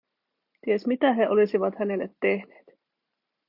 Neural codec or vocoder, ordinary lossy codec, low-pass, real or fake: none; Opus, 64 kbps; 5.4 kHz; real